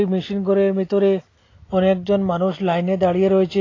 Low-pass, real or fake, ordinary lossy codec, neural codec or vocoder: 7.2 kHz; real; AAC, 32 kbps; none